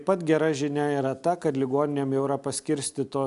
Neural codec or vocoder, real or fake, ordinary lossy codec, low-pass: none; real; AAC, 96 kbps; 10.8 kHz